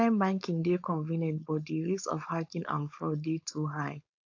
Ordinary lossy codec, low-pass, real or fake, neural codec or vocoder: AAC, 48 kbps; 7.2 kHz; fake; codec, 16 kHz, 4.8 kbps, FACodec